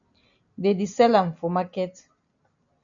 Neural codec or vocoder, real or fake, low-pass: none; real; 7.2 kHz